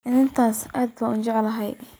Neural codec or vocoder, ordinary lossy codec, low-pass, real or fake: none; none; none; real